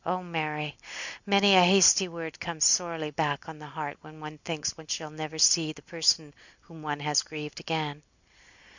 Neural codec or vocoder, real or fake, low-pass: none; real; 7.2 kHz